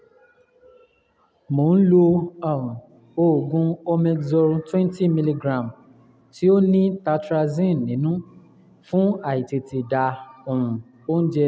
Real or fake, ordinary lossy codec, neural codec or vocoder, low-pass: real; none; none; none